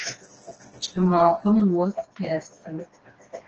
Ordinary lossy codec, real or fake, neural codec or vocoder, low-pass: Opus, 16 kbps; fake; codec, 16 kHz, 2 kbps, FreqCodec, smaller model; 7.2 kHz